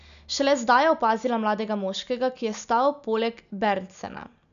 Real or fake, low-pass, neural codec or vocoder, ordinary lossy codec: real; 7.2 kHz; none; none